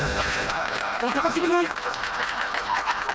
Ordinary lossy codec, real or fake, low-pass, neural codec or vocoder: none; fake; none; codec, 16 kHz, 1 kbps, FreqCodec, smaller model